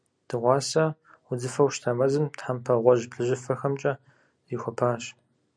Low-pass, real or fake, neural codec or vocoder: 9.9 kHz; real; none